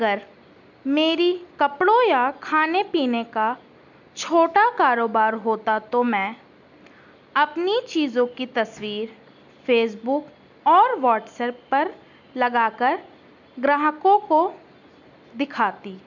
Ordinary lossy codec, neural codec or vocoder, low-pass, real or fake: none; none; 7.2 kHz; real